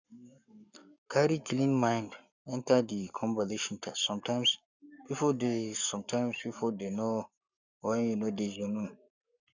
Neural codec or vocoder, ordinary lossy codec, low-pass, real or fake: codec, 44.1 kHz, 7.8 kbps, Pupu-Codec; none; 7.2 kHz; fake